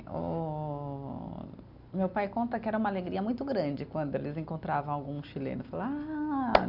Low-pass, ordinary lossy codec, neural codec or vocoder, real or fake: 5.4 kHz; none; none; real